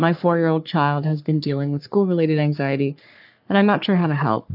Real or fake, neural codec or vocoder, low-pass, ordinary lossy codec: fake; codec, 44.1 kHz, 3.4 kbps, Pupu-Codec; 5.4 kHz; AAC, 48 kbps